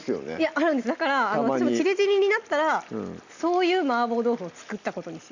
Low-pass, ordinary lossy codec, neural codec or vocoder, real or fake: 7.2 kHz; Opus, 64 kbps; none; real